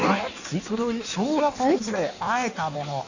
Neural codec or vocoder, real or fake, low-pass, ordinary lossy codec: codec, 16 kHz in and 24 kHz out, 1.1 kbps, FireRedTTS-2 codec; fake; 7.2 kHz; none